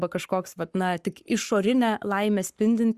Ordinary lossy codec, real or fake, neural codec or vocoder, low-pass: Opus, 64 kbps; fake; codec, 44.1 kHz, 7.8 kbps, Pupu-Codec; 14.4 kHz